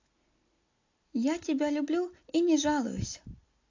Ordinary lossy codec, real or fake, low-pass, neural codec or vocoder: AAC, 48 kbps; real; 7.2 kHz; none